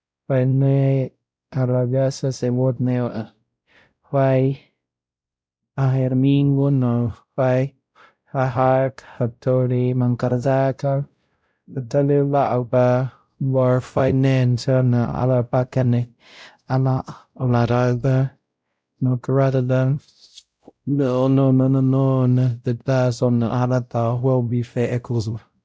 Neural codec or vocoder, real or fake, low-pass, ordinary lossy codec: codec, 16 kHz, 0.5 kbps, X-Codec, WavLM features, trained on Multilingual LibriSpeech; fake; none; none